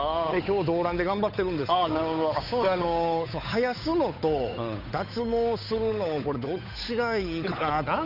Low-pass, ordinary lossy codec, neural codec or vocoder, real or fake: 5.4 kHz; none; codec, 16 kHz, 8 kbps, FunCodec, trained on Chinese and English, 25 frames a second; fake